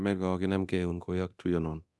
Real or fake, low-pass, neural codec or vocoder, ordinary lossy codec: fake; none; codec, 24 kHz, 0.9 kbps, DualCodec; none